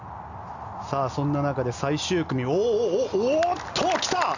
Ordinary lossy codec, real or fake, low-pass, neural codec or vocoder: none; real; 7.2 kHz; none